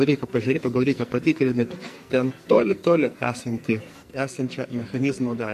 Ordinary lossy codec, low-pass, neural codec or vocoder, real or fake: MP3, 64 kbps; 14.4 kHz; codec, 44.1 kHz, 2.6 kbps, SNAC; fake